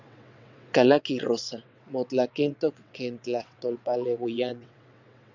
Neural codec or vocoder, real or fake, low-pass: vocoder, 22.05 kHz, 80 mel bands, WaveNeXt; fake; 7.2 kHz